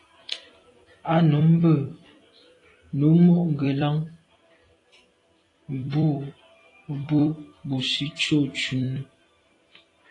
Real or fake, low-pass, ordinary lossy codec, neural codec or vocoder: fake; 10.8 kHz; AAC, 32 kbps; vocoder, 44.1 kHz, 128 mel bands every 256 samples, BigVGAN v2